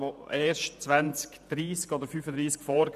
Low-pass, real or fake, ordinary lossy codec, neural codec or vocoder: 14.4 kHz; fake; none; vocoder, 48 kHz, 128 mel bands, Vocos